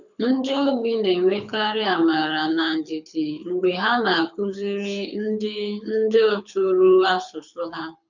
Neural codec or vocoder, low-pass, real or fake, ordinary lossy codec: codec, 24 kHz, 6 kbps, HILCodec; 7.2 kHz; fake; none